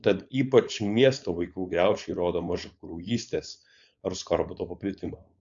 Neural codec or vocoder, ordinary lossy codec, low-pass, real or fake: codec, 16 kHz, 4.8 kbps, FACodec; MP3, 64 kbps; 7.2 kHz; fake